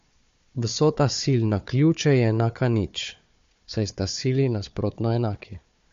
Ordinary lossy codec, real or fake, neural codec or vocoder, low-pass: MP3, 48 kbps; fake; codec, 16 kHz, 4 kbps, FunCodec, trained on Chinese and English, 50 frames a second; 7.2 kHz